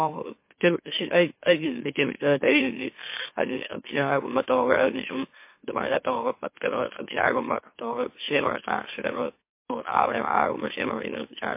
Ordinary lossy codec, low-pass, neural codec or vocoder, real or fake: MP3, 24 kbps; 3.6 kHz; autoencoder, 44.1 kHz, a latent of 192 numbers a frame, MeloTTS; fake